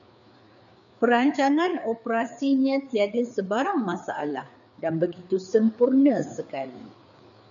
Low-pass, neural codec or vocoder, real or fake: 7.2 kHz; codec, 16 kHz, 4 kbps, FreqCodec, larger model; fake